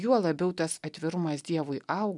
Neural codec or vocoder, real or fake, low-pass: none; real; 10.8 kHz